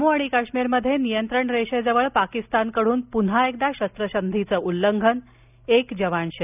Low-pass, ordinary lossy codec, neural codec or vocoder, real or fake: 3.6 kHz; none; none; real